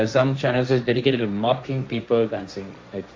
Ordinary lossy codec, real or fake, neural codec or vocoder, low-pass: none; fake; codec, 16 kHz, 1.1 kbps, Voila-Tokenizer; none